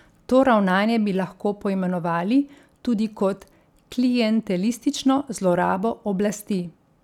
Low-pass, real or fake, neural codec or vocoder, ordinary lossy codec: 19.8 kHz; real; none; none